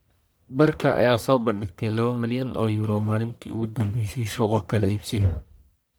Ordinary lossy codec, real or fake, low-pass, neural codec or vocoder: none; fake; none; codec, 44.1 kHz, 1.7 kbps, Pupu-Codec